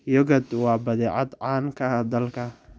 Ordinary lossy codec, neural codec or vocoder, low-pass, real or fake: none; none; none; real